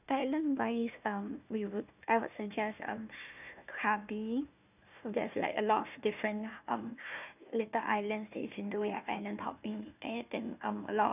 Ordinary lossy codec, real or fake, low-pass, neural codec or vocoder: none; fake; 3.6 kHz; codec, 16 kHz, 1 kbps, FunCodec, trained on Chinese and English, 50 frames a second